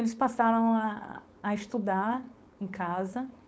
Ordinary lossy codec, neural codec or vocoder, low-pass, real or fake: none; codec, 16 kHz, 4.8 kbps, FACodec; none; fake